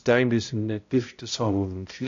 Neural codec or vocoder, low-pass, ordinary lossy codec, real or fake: codec, 16 kHz, 0.5 kbps, X-Codec, HuBERT features, trained on balanced general audio; 7.2 kHz; AAC, 48 kbps; fake